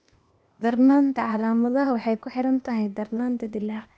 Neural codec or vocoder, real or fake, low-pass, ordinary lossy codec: codec, 16 kHz, 0.8 kbps, ZipCodec; fake; none; none